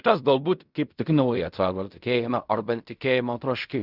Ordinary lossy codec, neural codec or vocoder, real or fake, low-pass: Opus, 64 kbps; codec, 16 kHz in and 24 kHz out, 0.4 kbps, LongCat-Audio-Codec, fine tuned four codebook decoder; fake; 5.4 kHz